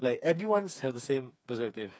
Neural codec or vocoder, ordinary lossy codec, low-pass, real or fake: codec, 16 kHz, 4 kbps, FreqCodec, smaller model; none; none; fake